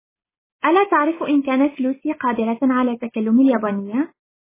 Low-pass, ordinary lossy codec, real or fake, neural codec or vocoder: 3.6 kHz; MP3, 16 kbps; real; none